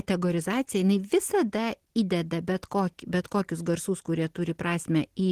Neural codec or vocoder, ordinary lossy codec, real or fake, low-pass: none; Opus, 16 kbps; real; 14.4 kHz